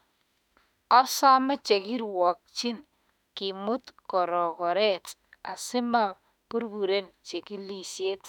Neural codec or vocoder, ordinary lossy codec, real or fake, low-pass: autoencoder, 48 kHz, 32 numbers a frame, DAC-VAE, trained on Japanese speech; none; fake; 19.8 kHz